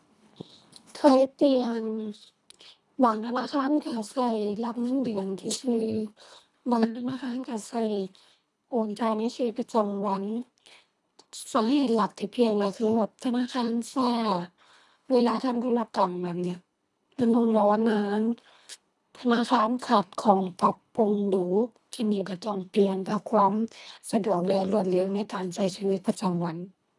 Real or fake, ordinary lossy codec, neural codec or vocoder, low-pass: fake; none; codec, 24 kHz, 1.5 kbps, HILCodec; none